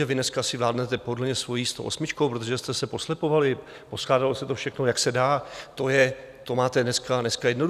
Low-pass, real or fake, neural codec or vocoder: 14.4 kHz; real; none